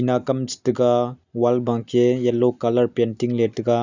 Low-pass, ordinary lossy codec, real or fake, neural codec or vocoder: 7.2 kHz; none; real; none